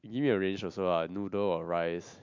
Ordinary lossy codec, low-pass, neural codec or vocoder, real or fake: MP3, 64 kbps; 7.2 kHz; none; real